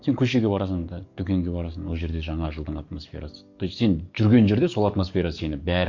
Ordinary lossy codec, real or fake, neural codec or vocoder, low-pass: MP3, 48 kbps; fake; codec, 44.1 kHz, 7.8 kbps, DAC; 7.2 kHz